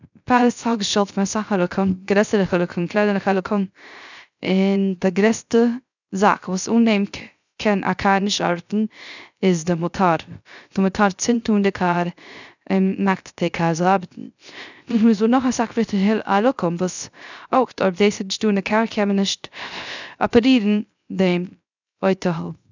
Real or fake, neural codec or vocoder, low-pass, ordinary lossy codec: fake; codec, 16 kHz, 0.3 kbps, FocalCodec; 7.2 kHz; none